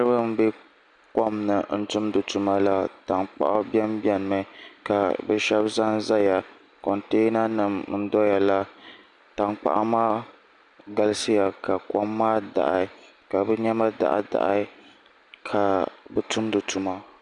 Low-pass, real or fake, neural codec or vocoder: 10.8 kHz; real; none